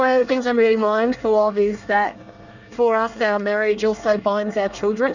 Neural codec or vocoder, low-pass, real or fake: codec, 24 kHz, 1 kbps, SNAC; 7.2 kHz; fake